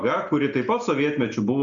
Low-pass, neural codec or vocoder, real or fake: 7.2 kHz; none; real